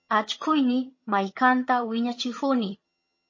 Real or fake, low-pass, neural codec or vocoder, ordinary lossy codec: fake; 7.2 kHz; vocoder, 22.05 kHz, 80 mel bands, HiFi-GAN; MP3, 32 kbps